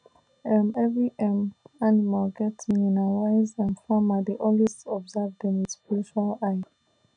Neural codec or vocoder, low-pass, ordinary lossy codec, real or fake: none; 9.9 kHz; none; real